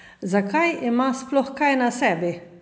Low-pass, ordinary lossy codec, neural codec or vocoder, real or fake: none; none; none; real